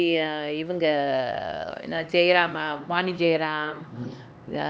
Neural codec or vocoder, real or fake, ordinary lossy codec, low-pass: codec, 16 kHz, 2 kbps, X-Codec, HuBERT features, trained on LibriSpeech; fake; none; none